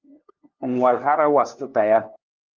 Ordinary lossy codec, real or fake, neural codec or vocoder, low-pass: Opus, 24 kbps; fake; codec, 16 kHz, 2 kbps, FunCodec, trained on LibriTTS, 25 frames a second; 7.2 kHz